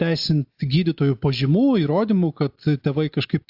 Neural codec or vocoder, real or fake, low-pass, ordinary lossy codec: none; real; 5.4 kHz; AAC, 48 kbps